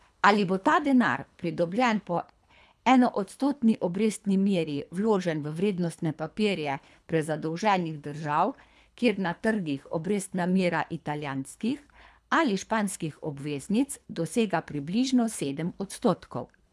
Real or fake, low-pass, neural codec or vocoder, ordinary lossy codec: fake; none; codec, 24 kHz, 3 kbps, HILCodec; none